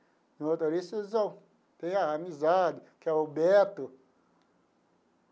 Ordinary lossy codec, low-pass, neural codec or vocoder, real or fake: none; none; none; real